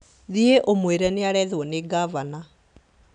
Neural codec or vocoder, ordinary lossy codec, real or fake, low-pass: none; none; real; 9.9 kHz